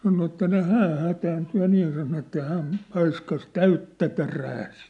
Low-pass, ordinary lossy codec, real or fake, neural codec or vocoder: 10.8 kHz; MP3, 96 kbps; real; none